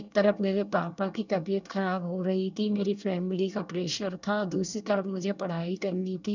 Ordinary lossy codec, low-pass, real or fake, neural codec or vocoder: none; 7.2 kHz; fake; codec, 24 kHz, 1 kbps, SNAC